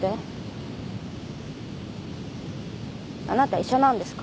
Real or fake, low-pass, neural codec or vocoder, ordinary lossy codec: real; none; none; none